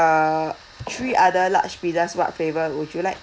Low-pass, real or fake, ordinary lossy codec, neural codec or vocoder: none; real; none; none